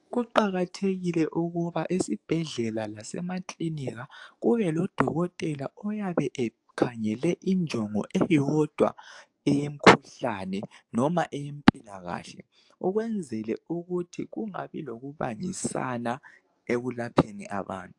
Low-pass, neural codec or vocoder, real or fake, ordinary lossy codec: 10.8 kHz; codec, 44.1 kHz, 7.8 kbps, DAC; fake; AAC, 64 kbps